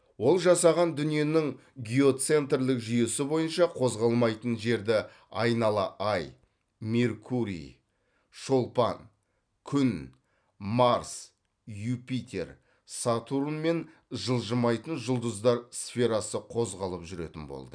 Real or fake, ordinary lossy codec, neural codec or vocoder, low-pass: real; none; none; 9.9 kHz